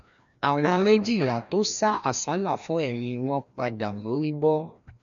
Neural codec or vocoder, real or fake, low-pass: codec, 16 kHz, 1 kbps, FreqCodec, larger model; fake; 7.2 kHz